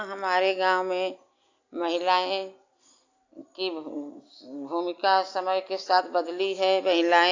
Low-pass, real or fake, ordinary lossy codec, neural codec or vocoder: 7.2 kHz; real; AAC, 48 kbps; none